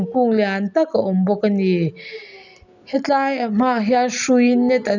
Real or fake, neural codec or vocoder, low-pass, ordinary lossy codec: real; none; 7.2 kHz; none